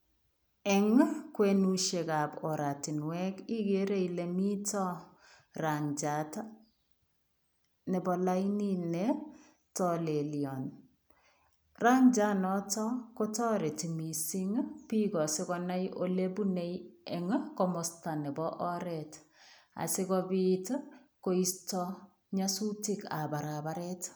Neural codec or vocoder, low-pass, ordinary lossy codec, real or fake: none; none; none; real